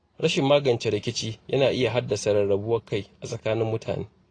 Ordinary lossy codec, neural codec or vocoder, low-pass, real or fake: AAC, 32 kbps; none; 9.9 kHz; real